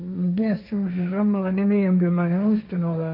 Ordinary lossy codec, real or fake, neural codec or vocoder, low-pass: none; fake; codec, 16 kHz, 1.1 kbps, Voila-Tokenizer; 5.4 kHz